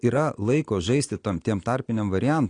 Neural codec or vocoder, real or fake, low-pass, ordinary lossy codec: none; real; 9.9 kHz; AAC, 48 kbps